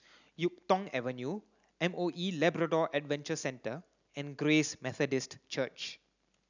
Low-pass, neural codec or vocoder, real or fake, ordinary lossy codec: 7.2 kHz; none; real; none